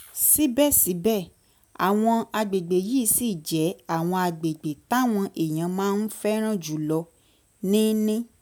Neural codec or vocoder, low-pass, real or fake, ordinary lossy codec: none; none; real; none